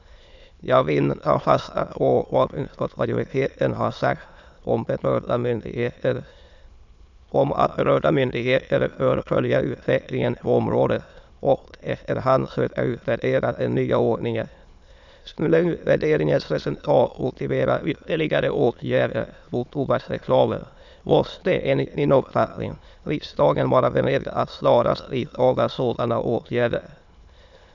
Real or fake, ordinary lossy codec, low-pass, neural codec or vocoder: fake; none; 7.2 kHz; autoencoder, 22.05 kHz, a latent of 192 numbers a frame, VITS, trained on many speakers